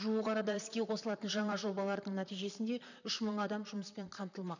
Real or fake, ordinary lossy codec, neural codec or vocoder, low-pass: fake; none; vocoder, 44.1 kHz, 128 mel bands, Pupu-Vocoder; 7.2 kHz